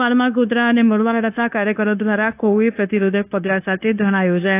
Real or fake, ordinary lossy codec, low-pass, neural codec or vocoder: fake; AAC, 24 kbps; 3.6 kHz; codec, 16 kHz, 0.9 kbps, LongCat-Audio-Codec